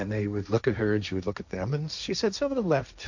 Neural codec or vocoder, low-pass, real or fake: codec, 16 kHz, 1.1 kbps, Voila-Tokenizer; 7.2 kHz; fake